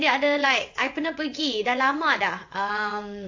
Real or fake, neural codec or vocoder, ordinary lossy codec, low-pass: fake; vocoder, 22.05 kHz, 80 mel bands, WaveNeXt; AAC, 48 kbps; 7.2 kHz